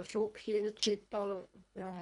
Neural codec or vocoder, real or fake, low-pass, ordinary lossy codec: codec, 24 kHz, 1.5 kbps, HILCodec; fake; 10.8 kHz; none